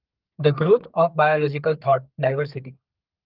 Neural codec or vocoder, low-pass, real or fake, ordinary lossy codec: codec, 32 kHz, 1.9 kbps, SNAC; 5.4 kHz; fake; Opus, 24 kbps